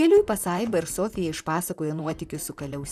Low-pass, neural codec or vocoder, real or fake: 14.4 kHz; vocoder, 44.1 kHz, 128 mel bands, Pupu-Vocoder; fake